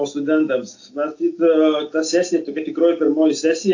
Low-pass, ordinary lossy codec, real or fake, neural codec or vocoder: 7.2 kHz; AAC, 48 kbps; real; none